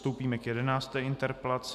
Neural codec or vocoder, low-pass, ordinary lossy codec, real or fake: none; 14.4 kHz; MP3, 96 kbps; real